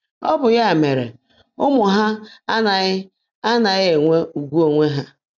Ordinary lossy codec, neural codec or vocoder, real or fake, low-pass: none; none; real; 7.2 kHz